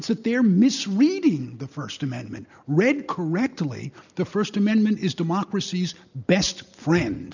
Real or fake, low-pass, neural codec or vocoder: real; 7.2 kHz; none